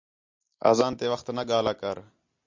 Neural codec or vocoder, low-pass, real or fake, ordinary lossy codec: none; 7.2 kHz; real; MP3, 48 kbps